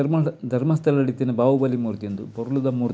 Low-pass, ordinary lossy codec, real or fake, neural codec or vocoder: none; none; real; none